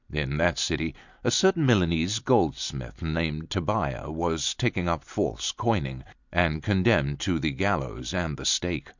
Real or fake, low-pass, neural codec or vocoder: real; 7.2 kHz; none